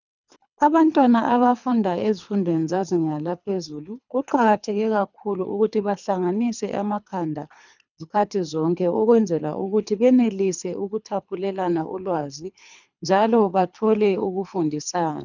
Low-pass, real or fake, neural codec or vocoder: 7.2 kHz; fake; codec, 24 kHz, 3 kbps, HILCodec